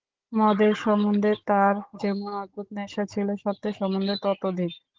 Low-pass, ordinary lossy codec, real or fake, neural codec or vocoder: 7.2 kHz; Opus, 16 kbps; fake; codec, 16 kHz, 16 kbps, FunCodec, trained on Chinese and English, 50 frames a second